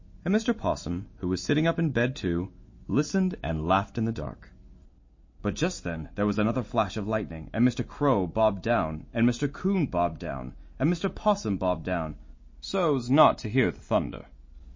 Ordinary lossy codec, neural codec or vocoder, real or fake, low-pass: MP3, 32 kbps; none; real; 7.2 kHz